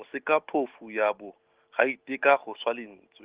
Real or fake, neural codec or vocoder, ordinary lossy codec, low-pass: real; none; Opus, 16 kbps; 3.6 kHz